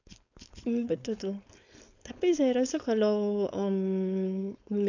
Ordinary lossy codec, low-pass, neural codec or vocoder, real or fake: none; 7.2 kHz; codec, 16 kHz, 4.8 kbps, FACodec; fake